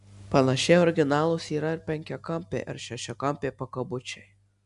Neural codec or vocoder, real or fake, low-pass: none; real; 10.8 kHz